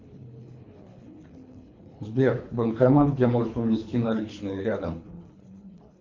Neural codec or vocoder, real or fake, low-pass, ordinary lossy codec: codec, 24 kHz, 3 kbps, HILCodec; fake; 7.2 kHz; MP3, 48 kbps